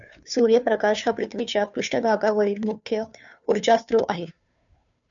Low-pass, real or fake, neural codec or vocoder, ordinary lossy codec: 7.2 kHz; fake; codec, 16 kHz, 2 kbps, FunCodec, trained on Chinese and English, 25 frames a second; MP3, 96 kbps